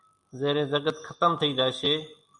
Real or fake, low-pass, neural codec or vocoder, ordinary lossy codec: real; 10.8 kHz; none; MP3, 96 kbps